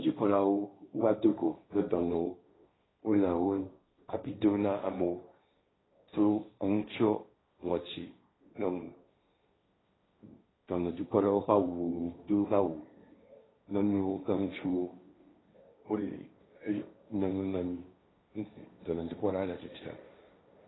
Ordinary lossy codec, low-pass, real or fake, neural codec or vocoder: AAC, 16 kbps; 7.2 kHz; fake; codec, 16 kHz, 1.1 kbps, Voila-Tokenizer